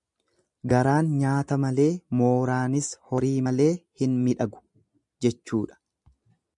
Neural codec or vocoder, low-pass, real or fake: none; 10.8 kHz; real